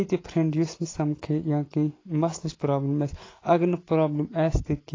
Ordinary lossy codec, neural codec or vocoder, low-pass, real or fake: AAC, 32 kbps; none; 7.2 kHz; real